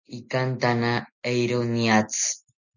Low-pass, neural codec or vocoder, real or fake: 7.2 kHz; none; real